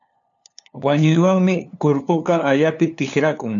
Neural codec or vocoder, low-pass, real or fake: codec, 16 kHz, 2 kbps, FunCodec, trained on LibriTTS, 25 frames a second; 7.2 kHz; fake